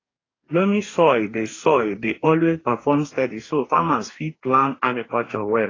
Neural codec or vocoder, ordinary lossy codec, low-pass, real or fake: codec, 44.1 kHz, 2.6 kbps, DAC; AAC, 32 kbps; 7.2 kHz; fake